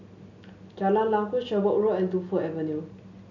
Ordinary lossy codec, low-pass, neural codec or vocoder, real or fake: none; 7.2 kHz; none; real